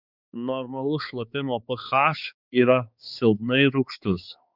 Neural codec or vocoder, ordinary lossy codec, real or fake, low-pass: codec, 16 kHz, 4 kbps, X-Codec, HuBERT features, trained on balanced general audio; Opus, 64 kbps; fake; 5.4 kHz